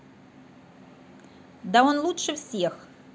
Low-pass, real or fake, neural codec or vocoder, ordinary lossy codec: none; real; none; none